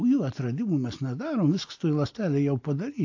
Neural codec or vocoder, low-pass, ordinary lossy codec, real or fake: none; 7.2 kHz; AAC, 48 kbps; real